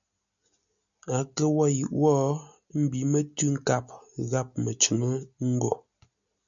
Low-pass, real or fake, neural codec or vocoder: 7.2 kHz; real; none